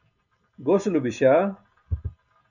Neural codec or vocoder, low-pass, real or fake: none; 7.2 kHz; real